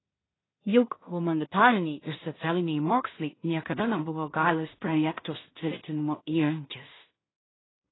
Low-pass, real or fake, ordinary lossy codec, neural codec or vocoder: 7.2 kHz; fake; AAC, 16 kbps; codec, 16 kHz in and 24 kHz out, 0.4 kbps, LongCat-Audio-Codec, two codebook decoder